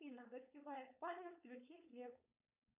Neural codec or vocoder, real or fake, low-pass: codec, 16 kHz, 4.8 kbps, FACodec; fake; 3.6 kHz